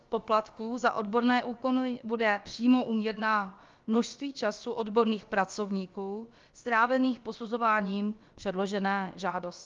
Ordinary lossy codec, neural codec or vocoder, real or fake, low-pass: Opus, 32 kbps; codec, 16 kHz, about 1 kbps, DyCAST, with the encoder's durations; fake; 7.2 kHz